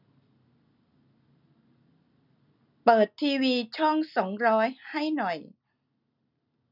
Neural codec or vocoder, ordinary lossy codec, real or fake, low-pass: none; none; real; 5.4 kHz